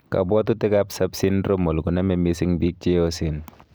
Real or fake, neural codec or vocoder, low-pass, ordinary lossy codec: real; none; none; none